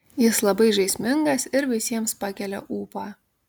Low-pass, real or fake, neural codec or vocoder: 19.8 kHz; real; none